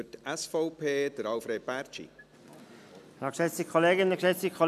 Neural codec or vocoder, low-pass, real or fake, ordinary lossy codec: none; 14.4 kHz; real; none